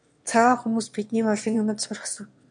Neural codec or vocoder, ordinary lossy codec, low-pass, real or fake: autoencoder, 22.05 kHz, a latent of 192 numbers a frame, VITS, trained on one speaker; MP3, 64 kbps; 9.9 kHz; fake